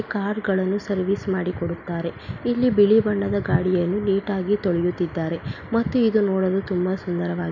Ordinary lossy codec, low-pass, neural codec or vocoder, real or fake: none; 7.2 kHz; none; real